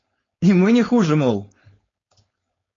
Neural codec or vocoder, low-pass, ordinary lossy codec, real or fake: codec, 16 kHz, 4.8 kbps, FACodec; 7.2 kHz; AAC, 32 kbps; fake